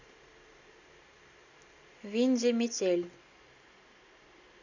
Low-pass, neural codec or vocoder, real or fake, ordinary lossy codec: 7.2 kHz; none; real; none